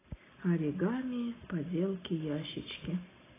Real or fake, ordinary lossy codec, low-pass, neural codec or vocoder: real; AAC, 16 kbps; 3.6 kHz; none